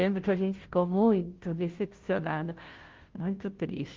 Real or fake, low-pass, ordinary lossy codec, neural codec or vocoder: fake; 7.2 kHz; Opus, 16 kbps; codec, 16 kHz, 0.5 kbps, FunCodec, trained on Chinese and English, 25 frames a second